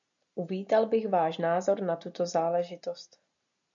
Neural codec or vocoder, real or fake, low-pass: none; real; 7.2 kHz